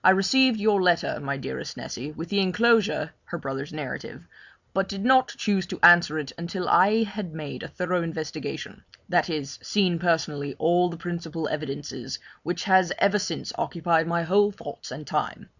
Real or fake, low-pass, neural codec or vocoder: real; 7.2 kHz; none